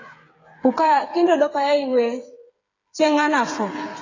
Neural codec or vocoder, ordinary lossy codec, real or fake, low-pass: codec, 16 kHz, 8 kbps, FreqCodec, smaller model; AAC, 32 kbps; fake; 7.2 kHz